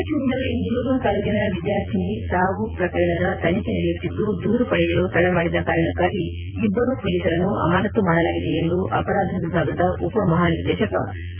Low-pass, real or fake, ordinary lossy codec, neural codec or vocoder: 3.6 kHz; fake; none; vocoder, 22.05 kHz, 80 mel bands, Vocos